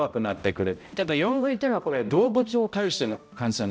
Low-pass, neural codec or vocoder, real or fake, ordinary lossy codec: none; codec, 16 kHz, 0.5 kbps, X-Codec, HuBERT features, trained on balanced general audio; fake; none